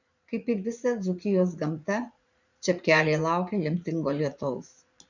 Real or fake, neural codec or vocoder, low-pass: fake; vocoder, 44.1 kHz, 80 mel bands, Vocos; 7.2 kHz